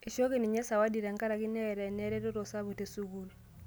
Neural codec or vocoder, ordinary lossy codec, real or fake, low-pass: none; none; real; none